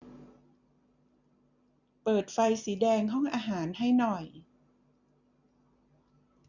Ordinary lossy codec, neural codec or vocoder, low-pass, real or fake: none; none; 7.2 kHz; real